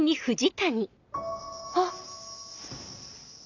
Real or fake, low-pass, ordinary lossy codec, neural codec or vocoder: real; 7.2 kHz; none; none